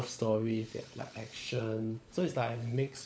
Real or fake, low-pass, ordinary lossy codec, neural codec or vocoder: fake; none; none; codec, 16 kHz, 16 kbps, FunCodec, trained on LibriTTS, 50 frames a second